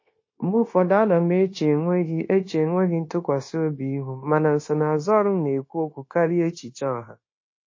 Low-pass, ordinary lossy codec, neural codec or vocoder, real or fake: 7.2 kHz; MP3, 32 kbps; codec, 16 kHz, 0.9 kbps, LongCat-Audio-Codec; fake